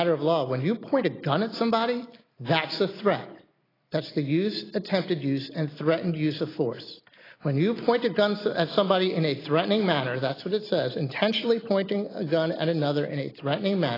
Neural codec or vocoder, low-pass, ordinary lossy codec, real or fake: none; 5.4 kHz; AAC, 24 kbps; real